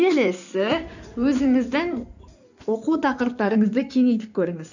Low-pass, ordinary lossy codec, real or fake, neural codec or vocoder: 7.2 kHz; none; fake; codec, 16 kHz in and 24 kHz out, 2.2 kbps, FireRedTTS-2 codec